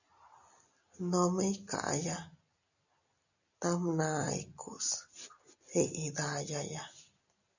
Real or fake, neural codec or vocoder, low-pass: real; none; 7.2 kHz